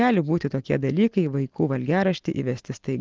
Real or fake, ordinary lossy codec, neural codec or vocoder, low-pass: real; Opus, 16 kbps; none; 7.2 kHz